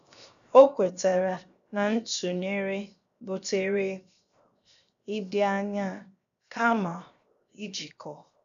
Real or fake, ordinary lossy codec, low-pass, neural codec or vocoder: fake; none; 7.2 kHz; codec, 16 kHz, 0.7 kbps, FocalCodec